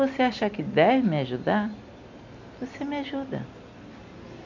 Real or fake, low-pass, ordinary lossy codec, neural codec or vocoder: real; 7.2 kHz; none; none